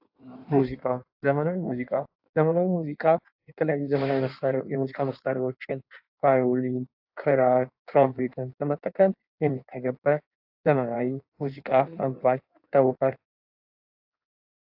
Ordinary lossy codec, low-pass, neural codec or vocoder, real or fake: AAC, 48 kbps; 5.4 kHz; codec, 16 kHz in and 24 kHz out, 1.1 kbps, FireRedTTS-2 codec; fake